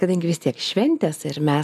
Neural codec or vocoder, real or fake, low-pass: none; real; 14.4 kHz